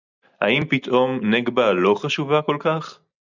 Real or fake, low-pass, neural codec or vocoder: real; 7.2 kHz; none